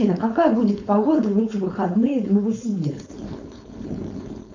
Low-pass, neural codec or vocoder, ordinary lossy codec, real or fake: 7.2 kHz; codec, 16 kHz, 4.8 kbps, FACodec; MP3, 64 kbps; fake